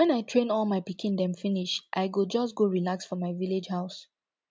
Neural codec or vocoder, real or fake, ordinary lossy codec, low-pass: none; real; none; none